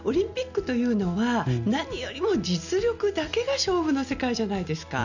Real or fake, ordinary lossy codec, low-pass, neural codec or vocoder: real; none; 7.2 kHz; none